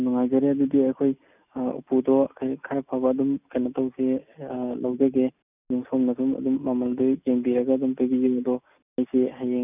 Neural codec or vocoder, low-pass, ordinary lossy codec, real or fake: none; 3.6 kHz; none; real